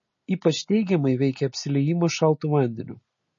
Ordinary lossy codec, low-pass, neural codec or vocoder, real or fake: MP3, 32 kbps; 7.2 kHz; none; real